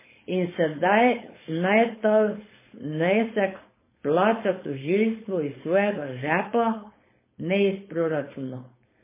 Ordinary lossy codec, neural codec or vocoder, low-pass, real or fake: MP3, 16 kbps; codec, 16 kHz, 4.8 kbps, FACodec; 3.6 kHz; fake